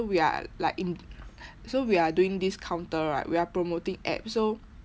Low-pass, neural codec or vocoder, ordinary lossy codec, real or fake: none; none; none; real